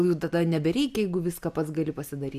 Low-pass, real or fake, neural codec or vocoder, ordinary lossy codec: 14.4 kHz; real; none; AAC, 96 kbps